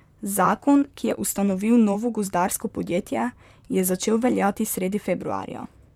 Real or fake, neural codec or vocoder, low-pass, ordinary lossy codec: fake; vocoder, 44.1 kHz, 128 mel bands, Pupu-Vocoder; 19.8 kHz; MP3, 96 kbps